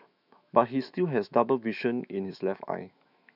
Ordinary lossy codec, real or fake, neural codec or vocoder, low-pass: none; fake; autoencoder, 48 kHz, 128 numbers a frame, DAC-VAE, trained on Japanese speech; 5.4 kHz